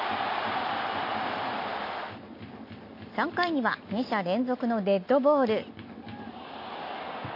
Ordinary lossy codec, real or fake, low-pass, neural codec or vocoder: MP3, 32 kbps; real; 5.4 kHz; none